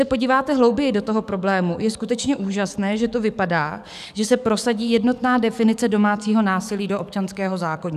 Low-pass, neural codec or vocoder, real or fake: 14.4 kHz; codec, 44.1 kHz, 7.8 kbps, DAC; fake